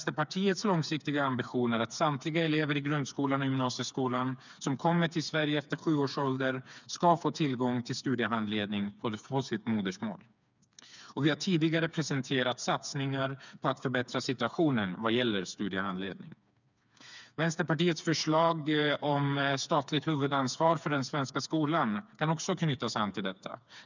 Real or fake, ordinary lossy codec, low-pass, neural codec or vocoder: fake; none; 7.2 kHz; codec, 16 kHz, 4 kbps, FreqCodec, smaller model